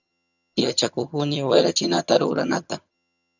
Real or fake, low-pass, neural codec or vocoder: fake; 7.2 kHz; vocoder, 22.05 kHz, 80 mel bands, HiFi-GAN